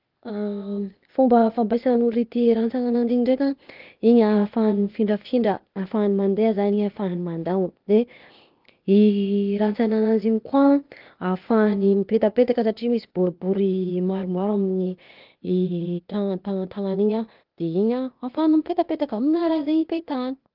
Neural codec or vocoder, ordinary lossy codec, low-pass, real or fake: codec, 16 kHz, 0.8 kbps, ZipCodec; Opus, 32 kbps; 5.4 kHz; fake